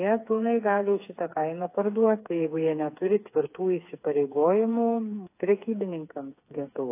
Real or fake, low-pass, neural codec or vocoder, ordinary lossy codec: fake; 3.6 kHz; codec, 16 kHz, 4 kbps, FreqCodec, smaller model; AAC, 24 kbps